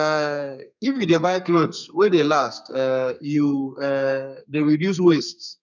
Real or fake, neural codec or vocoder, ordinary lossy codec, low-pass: fake; codec, 32 kHz, 1.9 kbps, SNAC; none; 7.2 kHz